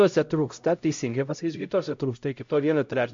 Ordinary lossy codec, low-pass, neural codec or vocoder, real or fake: MP3, 48 kbps; 7.2 kHz; codec, 16 kHz, 0.5 kbps, X-Codec, HuBERT features, trained on LibriSpeech; fake